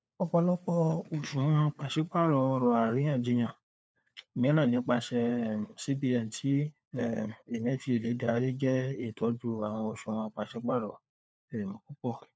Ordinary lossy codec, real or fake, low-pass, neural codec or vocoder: none; fake; none; codec, 16 kHz, 4 kbps, FunCodec, trained on LibriTTS, 50 frames a second